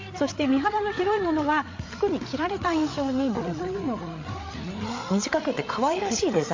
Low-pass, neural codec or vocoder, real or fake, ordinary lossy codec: 7.2 kHz; codec, 16 kHz, 8 kbps, FreqCodec, larger model; fake; MP3, 48 kbps